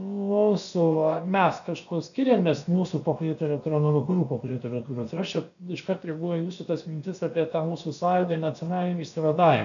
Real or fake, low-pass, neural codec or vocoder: fake; 7.2 kHz; codec, 16 kHz, about 1 kbps, DyCAST, with the encoder's durations